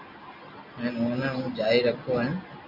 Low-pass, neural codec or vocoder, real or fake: 5.4 kHz; none; real